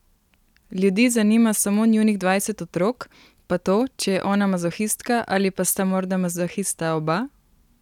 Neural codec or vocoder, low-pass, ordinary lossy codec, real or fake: none; 19.8 kHz; none; real